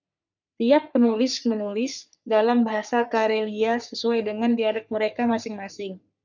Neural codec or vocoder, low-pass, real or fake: codec, 44.1 kHz, 3.4 kbps, Pupu-Codec; 7.2 kHz; fake